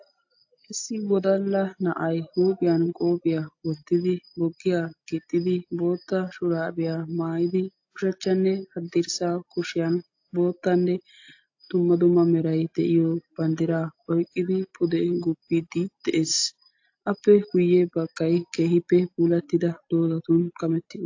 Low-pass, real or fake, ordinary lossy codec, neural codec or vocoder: 7.2 kHz; real; AAC, 48 kbps; none